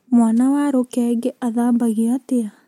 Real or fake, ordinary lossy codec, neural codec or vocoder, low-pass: real; MP3, 64 kbps; none; 19.8 kHz